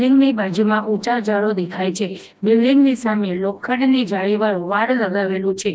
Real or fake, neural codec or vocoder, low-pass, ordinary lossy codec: fake; codec, 16 kHz, 1 kbps, FreqCodec, smaller model; none; none